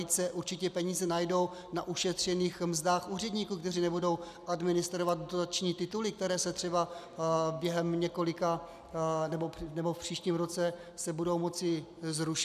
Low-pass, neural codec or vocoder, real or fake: 14.4 kHz; none; real